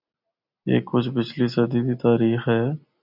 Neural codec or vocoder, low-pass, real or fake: none; 5.4 kHz; real